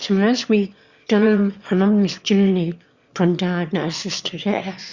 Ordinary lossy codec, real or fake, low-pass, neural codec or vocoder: Opus, 64 kbps; fake; 7.2 kHz; autoencoder, 22.05 kHz, a latent of 192 numbers a frame, VITS, trained on one speaker